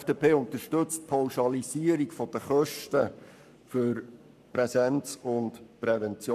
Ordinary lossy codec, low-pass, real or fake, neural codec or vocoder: none; 14.4 kHz; fake; codec, 44.1 kHz, 7.8 kbps, Pupu-Codec